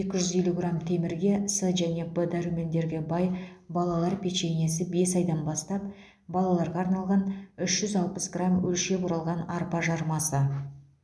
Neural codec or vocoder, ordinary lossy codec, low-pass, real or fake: none; none; none; real